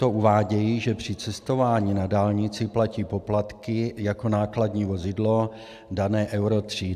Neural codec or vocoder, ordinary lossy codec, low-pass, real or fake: none; AAC, 96 kbps; 14.4 kHz; real